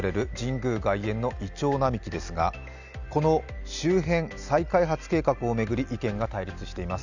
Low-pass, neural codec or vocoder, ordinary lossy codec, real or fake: 7.2 kHz; none; none; real